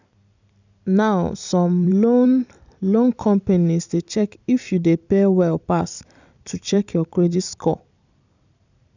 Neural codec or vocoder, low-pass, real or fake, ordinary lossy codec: vocoder, 44.1 kHz, 128 mel bands every 256 samples, BigVGAN v2; 7.2 kHz; fake; none